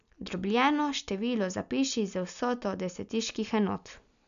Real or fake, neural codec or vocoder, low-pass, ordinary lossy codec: real; none; 7.2 kHz; none